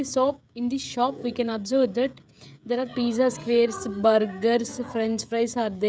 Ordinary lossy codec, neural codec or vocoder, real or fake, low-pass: none; codec, 16 kHz, 16 kbps, FreqCodec, smaller model; fake; none